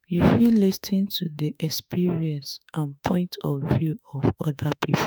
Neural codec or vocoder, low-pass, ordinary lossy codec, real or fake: autoencoder, 48 kHz, 32 numbers a frame, DAC-VAE, trained on Japanese speech; none; none; fake